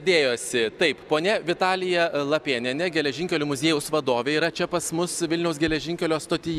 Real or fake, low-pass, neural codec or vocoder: real; 14.4 kHz; none